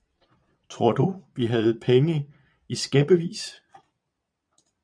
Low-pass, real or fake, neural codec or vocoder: 9.9 kHz; fake; vocoder, 22.05 kHz, 80 mel bands, Vocos